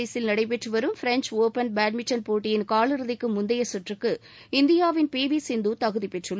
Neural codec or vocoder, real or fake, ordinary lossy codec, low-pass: none; real; none; none